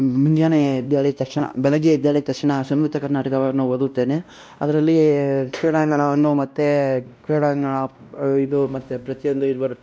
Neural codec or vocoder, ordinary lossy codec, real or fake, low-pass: codec, 16 kHz, 1 kbps, X-Codec, WavLM features, trained on Multilingual LibriSpeech; none; fake; none